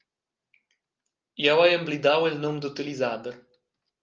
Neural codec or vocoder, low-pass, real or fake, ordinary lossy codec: none; 7.2 kHz; real; Opus, 24 kbps